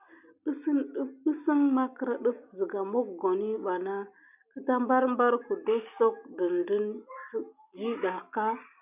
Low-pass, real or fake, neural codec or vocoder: 3.6 kHz; real; none